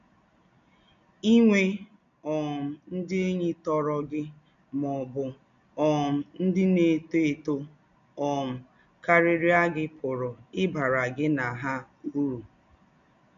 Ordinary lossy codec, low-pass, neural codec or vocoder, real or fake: AAC, 96 kbps; 7.2 kHz; none; real